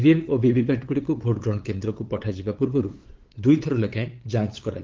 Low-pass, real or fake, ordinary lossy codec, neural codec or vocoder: 7.2 kHz; fake; Opus, 16 kbps; codec, 16 kHz, 8 kbps, FunCodec, trained on LibriTTS, 25 frames a second